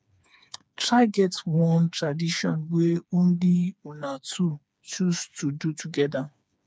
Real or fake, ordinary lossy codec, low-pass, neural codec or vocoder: fake; none; none; codec, 16 kHz, 4 kbps, FreqCodec, smaller model